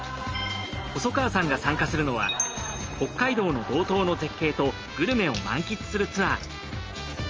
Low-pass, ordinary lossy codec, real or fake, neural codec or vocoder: 7.2 kHz; Opus, 24 kbps; real; none